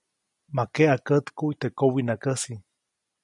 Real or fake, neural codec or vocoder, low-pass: real; none; 10.8 kHz